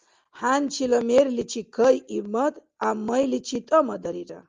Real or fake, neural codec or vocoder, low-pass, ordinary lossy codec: real; none; 7.2 kHz; Opus, 32 kbps